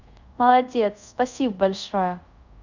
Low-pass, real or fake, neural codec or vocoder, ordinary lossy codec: 7.2 kHz; fake; codec, 24 kHz, 0.5 kbps, DualCodec; none